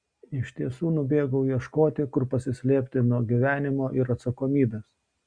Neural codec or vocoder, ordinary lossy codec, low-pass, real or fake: none; Opus, 64 kbps; 9.9 kHz; real